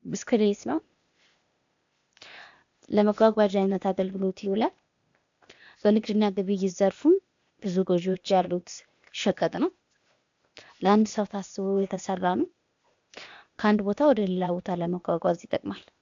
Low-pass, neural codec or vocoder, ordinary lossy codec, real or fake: 7.2 kHz; codec, 16 kHz, 0.8 kbps, ZipCodec; AAC, 64 kbps; fake